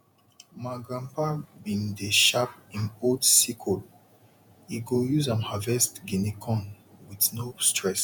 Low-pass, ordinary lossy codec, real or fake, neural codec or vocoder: 19.8 kHz; none; fake; vocoder, 48 kHz, 128 mel bands, Vocos